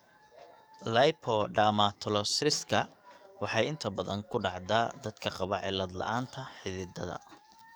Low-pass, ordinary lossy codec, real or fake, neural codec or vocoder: none; none; fake; codec, 44.1 kHz, 7.8 kbps, DAC